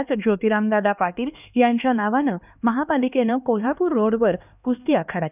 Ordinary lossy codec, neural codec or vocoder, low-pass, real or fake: none; codec, 16 kHz, 2 kbps, X-Codec, HuBERT features, trained on LibriSpeech; 3.6 kHz; fake